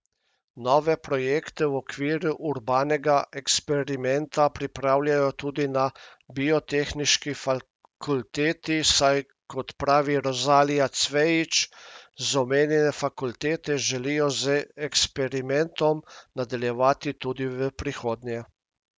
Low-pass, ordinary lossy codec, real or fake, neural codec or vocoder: none; none; real; none